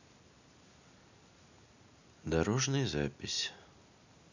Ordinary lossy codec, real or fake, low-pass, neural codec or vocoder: none; real; 7.2 kHz; none